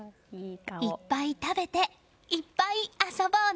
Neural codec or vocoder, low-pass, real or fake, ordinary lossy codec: none; none; real; none